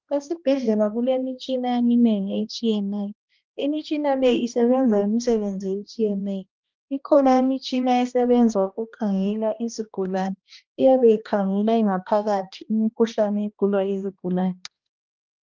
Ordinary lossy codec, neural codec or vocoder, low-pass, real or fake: Opus, 24 kbps; codec, 16 kHz, 1 kbps, X-Codec, HuBERT features, trained on balanced general audio; 7.2 kHz; fake